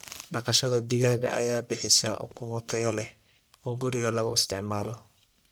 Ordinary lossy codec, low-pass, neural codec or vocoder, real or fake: none; none; codec, 44.1 kHz, 1.7 kbps, Pupu-Codec; fake